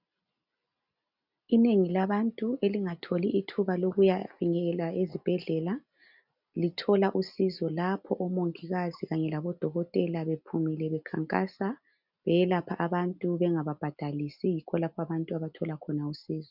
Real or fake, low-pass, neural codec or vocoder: real; 5.4 kHz; none